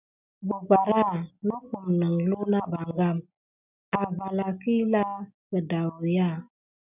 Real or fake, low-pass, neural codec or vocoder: real; 3.6 kHz; none